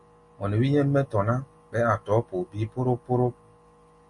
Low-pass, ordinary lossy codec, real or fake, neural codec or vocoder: 10.8 kHz; MP3, 48 kbps; real; none